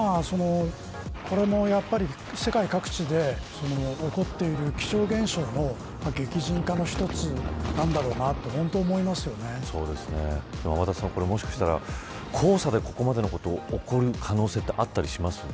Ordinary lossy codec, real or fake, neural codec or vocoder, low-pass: none; real; none; none